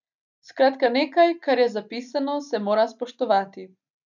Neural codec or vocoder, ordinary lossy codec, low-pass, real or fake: none; none; 7.2 kHz; real